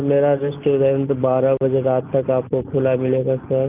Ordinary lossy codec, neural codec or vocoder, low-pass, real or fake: Opus, 24 kbps; none; 3.6 kHz; real